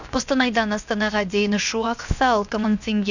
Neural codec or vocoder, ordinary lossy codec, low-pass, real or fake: codec, 16 kHz, 0.7 kbps, FocalCodec; none; 7.2 kHz; fake